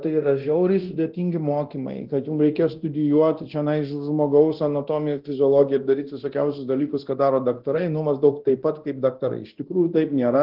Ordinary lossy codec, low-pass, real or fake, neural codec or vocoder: Opus, 16 kbps; 5.4 kHz; fake; codec, 24 kHz, 0.9 kbps, DualCodec